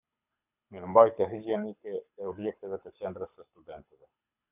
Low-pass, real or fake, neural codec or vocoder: 3.6 kHz; fake; codec, 24 kHz, 6 kbps, HILCodec